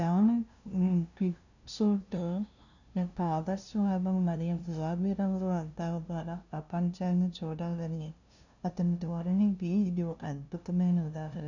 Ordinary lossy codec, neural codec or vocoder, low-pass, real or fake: none; codec, 16 kHz, 0.5 kbps, FunCodec, trained on LibriTTS, 25 frames a second; 7.2 kHz; fake